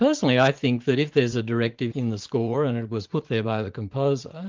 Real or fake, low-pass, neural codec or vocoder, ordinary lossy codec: fake; 7.2 kHz; vocoder, 44.1 kHz, 80 mel bands, Vocos; Opus, 24 kbps